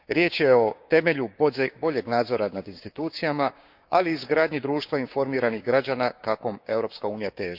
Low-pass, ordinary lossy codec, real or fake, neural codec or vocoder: 5.4 kHz; none; fake; codec, 16 kHz, 6 kbps, DAC